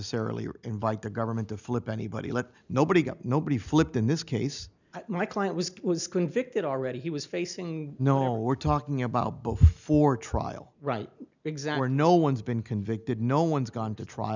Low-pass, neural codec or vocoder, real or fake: 7.2 kHz; none; real